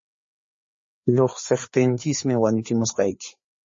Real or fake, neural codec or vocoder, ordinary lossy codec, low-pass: fake; codec, 16 kHz, 4 kbps, FreqCodec, larger model; MP3, 32 kbps; 7.2 kHz